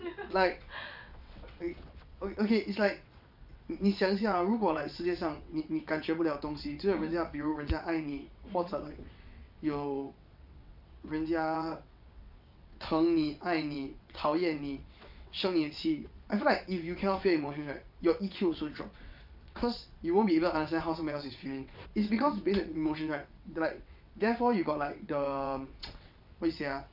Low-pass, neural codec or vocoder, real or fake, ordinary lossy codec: 5.4 kHz; vocoder, 22.05 kHz, 80 mel bands, WaveNeXt; fake; none